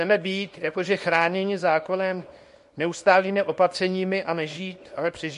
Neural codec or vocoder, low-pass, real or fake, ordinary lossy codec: codec, 24 kHz, 0.9 kbps, WavTokenizer, small release; 10.8 kHz; fake; MP3, 48 kbps